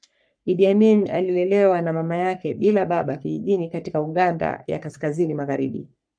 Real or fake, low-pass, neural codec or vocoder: fake; 9.9 kHz; codec, 44.1 kHz, 3.4 kbps, Pupu-Codec